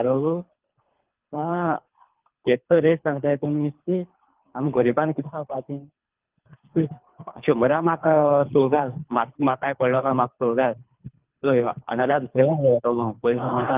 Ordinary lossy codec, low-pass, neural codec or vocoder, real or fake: Opus, 32 kbps; 3.6 kHz; codec, 24 kHz, 3 kbps, HILCodec; fake